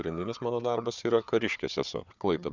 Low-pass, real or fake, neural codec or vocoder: 7.2 kHz; fake; codec, 16 kHz, 4 kbps, FunCodec, trained on Chinese and English, 50 frames a second